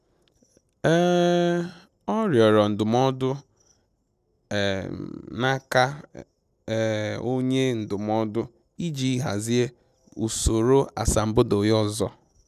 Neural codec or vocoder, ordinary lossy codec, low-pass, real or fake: none; none; 14.4 kHz; real